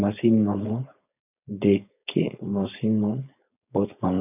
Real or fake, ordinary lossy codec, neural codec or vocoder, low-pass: fake; none; codec, 16 kHz, 4.8 kbps, FACodec; 3.6 kHz